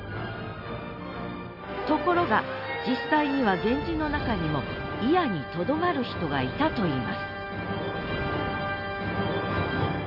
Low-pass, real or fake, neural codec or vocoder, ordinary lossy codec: 5.4 kHz; real; none; none